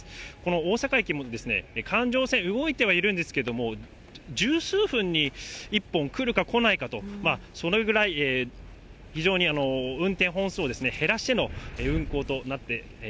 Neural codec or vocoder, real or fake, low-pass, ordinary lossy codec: none; real; none; none